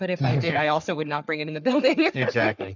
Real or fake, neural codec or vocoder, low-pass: fake; codec, 44.1 kHz, 3.4 kbps, Pupu-Codec; 7.2 kHz